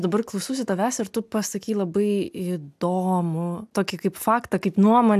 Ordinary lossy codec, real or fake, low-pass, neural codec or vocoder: AAC, 96 kbps; real; 14.4 kHz; none